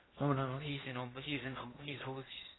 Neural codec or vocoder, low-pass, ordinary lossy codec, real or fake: codec, 16 kHz in and 24 kHz out, 0.8 kbps, FocalCodec, streaming, 65536 codes; 7.2 kHz; AAC, 16 kbps; fake